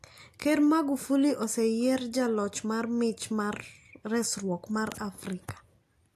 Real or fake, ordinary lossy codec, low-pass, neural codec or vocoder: real; AAC, 64 kbps; 14.4 kHz; none